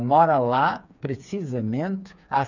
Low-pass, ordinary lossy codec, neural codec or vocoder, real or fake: 7.2 kHz; none; codec, 16 kHz, 4 kbps, FreqCodec, smaller model; fake